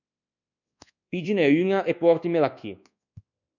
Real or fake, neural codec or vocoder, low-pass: fake; codec, 24 kHz, 1.2 kbps, DualCodec; 7.2 kHz